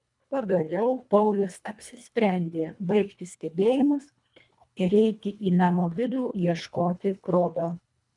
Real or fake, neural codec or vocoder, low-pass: fake; codec, 24 kHz, 1.5 kbps, HILCodec; 10.8 kHz